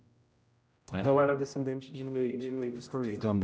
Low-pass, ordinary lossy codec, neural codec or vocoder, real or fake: none; none; codec, 16 kHz, 0.5 kbps, X-Codec, HuBERT features, trained on general audio; fake